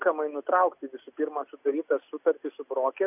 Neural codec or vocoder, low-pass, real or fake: none; 3.6 kHz; real